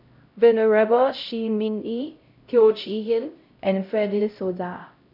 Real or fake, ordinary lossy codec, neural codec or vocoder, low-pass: fake; none; codec, 16 kHz, 0.5 kbps, X-Codec, HuBERT features, trained on LibriSpeech; 5.4 kHz